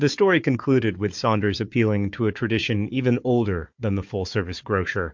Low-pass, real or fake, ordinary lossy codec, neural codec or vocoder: 7.2 kHz; fake; MP3, 48 kbps; codec, 16 kHz, 4 kbps, FunCodec, trained on Chinese and English, 50 frames a second